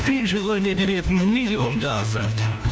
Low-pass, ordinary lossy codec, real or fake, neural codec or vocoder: none; none; fake; codec, 16 kHz, 1 kbps, FunCodec, trained on LibriTTS, 50 frames a second